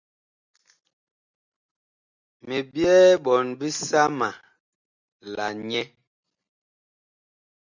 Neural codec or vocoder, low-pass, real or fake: none; 7.2 kHz; real